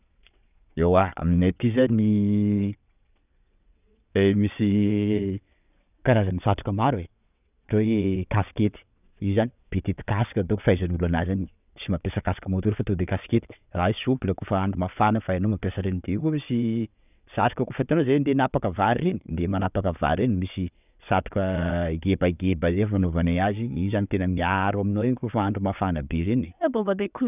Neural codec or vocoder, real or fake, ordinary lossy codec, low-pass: vocoder, 22.05 kHz, 80 mel bands, WaveNeXt; fake; none; 3.6 kHz